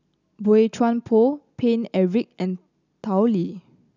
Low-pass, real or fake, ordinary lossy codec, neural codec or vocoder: 7.2 kHz; real; none; none